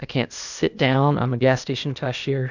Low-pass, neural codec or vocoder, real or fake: 7.2 kHz; codec, 16 kHz, 0.8 kbps, ZipCodec; fake